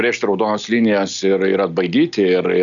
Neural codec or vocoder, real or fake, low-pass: none; real; 7.2 kHz